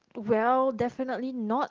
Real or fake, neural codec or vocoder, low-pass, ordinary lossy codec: real; none; 7.2 kHz; Opus, 16 kbps